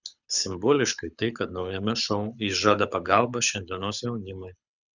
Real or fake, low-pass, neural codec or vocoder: fake; 7.2 kHz; codec, 24 kHz, 6 kbps, HILCodec